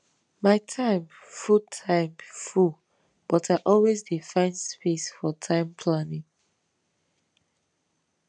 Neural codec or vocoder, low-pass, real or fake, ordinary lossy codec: none; 9.9 kHz; real; none